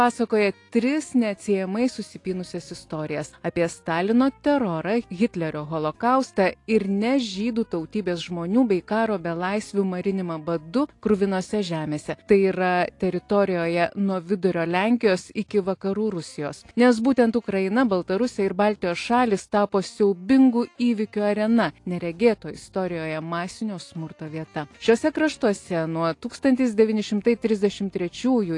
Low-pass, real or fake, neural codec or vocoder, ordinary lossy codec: 10.8 kHz; real; none; AAC, 48 kbps